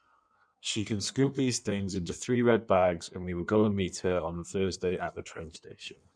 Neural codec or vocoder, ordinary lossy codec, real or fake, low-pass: codec, 16 kHz in and 24 kHz out, 1.1 kbps, FireRedTTS-2 codec; none; fake; 9.9 kHz